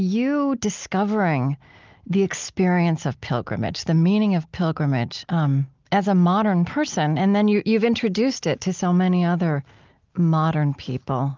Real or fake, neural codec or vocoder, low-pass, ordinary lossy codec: real; none; 7.2 kHz; Opus, 24 kbps